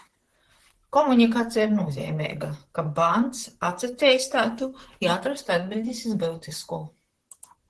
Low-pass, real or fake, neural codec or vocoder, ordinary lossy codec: 10.8 kHz; fake; vocoder, 44.1 kHz, 128 mel bands, Pupu-Vocoder; Opus, 16 kbps